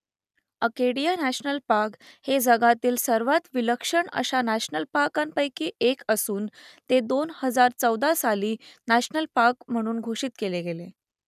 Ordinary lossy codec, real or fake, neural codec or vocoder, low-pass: none; real; none; 14.4 kHz